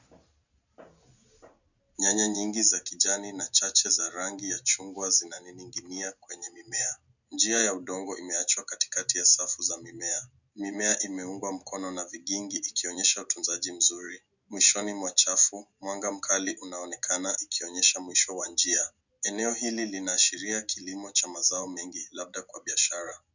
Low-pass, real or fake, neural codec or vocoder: 7.2 kHz; real; none